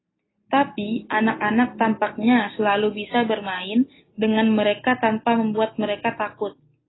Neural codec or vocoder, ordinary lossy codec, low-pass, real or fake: none; AAC, 16 kbps; 7.2 kHz; real